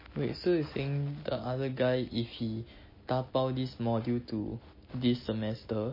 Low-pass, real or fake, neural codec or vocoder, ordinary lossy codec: 5.4 kHz; real; none; MP3, 24 kbps